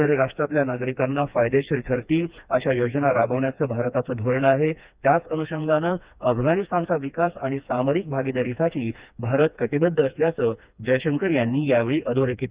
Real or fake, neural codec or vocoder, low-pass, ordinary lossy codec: fake; codec, 16 kHz, 2 kbps, FreqCodec, smaller model; 3.6 kHz; Opus, 64 kbps